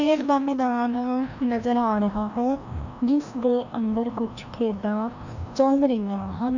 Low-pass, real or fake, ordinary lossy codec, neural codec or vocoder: 7.2 kHz; fake; none; codec, 16 kHz, 1 kbps, FreqCodec, larger model